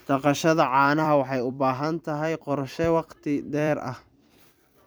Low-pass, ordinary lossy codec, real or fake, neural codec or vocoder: none; none; fake; vocoder, 44.1 kHz, 128 mel bands every 256 samples, BigVGAN v2